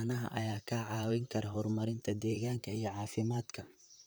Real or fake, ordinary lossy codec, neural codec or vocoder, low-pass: fake; none; vocoder, 44.1 kHz, 128 mel bands, Pupu-Vocoder; none